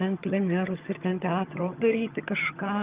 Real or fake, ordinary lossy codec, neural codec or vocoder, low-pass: fake; Opus, 24 kbps; vocoder, 22.05 kHz, 80 mel bands, HiFi-GAN; 3.6 kHz